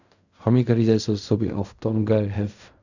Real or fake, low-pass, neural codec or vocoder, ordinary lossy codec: fake; 7.2 kHz; codec, 16 kHz in and 24 kHz out, 0.4 kbps, LongCat-Audio-Codec, fine tuned four codebook decoder; none